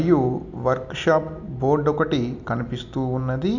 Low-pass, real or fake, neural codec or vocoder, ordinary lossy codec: 7.2 kHz; real; none; none